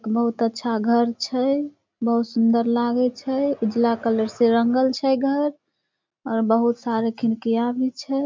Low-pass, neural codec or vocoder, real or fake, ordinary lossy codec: 7.2 kHz; none; real; MP3, 64 kbps